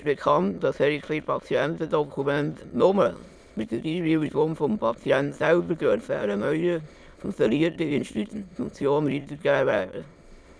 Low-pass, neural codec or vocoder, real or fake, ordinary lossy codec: none; autoencoder, 22.05 kHz, a latent of 192 numbers a frame, VITS, trained on many speakers; fake; none